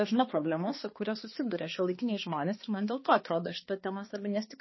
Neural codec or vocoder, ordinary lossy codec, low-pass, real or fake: codec, 16 kHz, 2 kbps, X-Codec, HuBERT features, trained on balanced general audio; MP3, 24 kbps; 7.2 kHz; fake